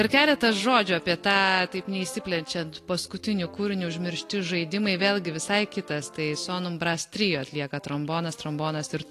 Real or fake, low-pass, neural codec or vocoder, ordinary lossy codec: real; 14.4 kHz; none; AAC, 48 kbps